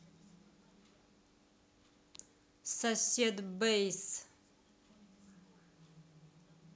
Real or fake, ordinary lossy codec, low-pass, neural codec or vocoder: real; none; none; none